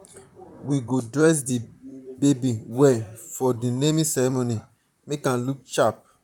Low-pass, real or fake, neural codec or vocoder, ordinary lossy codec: 19.8 kHz; fake; vocoder, 44.1 kHz, 128 mel bands, Pupu-Vocoder; none